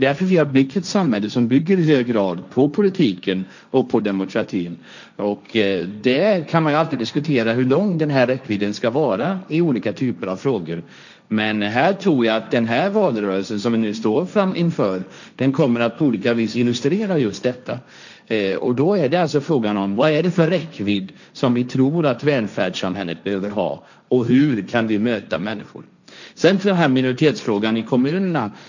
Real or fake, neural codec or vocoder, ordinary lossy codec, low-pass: fake; codec, 16 kHz, 1.1 kbps, Voila-Tokenizer; none; 7.2 kHz